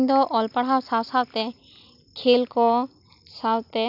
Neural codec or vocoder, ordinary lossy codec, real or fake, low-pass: none; none; real; 5.4 kHz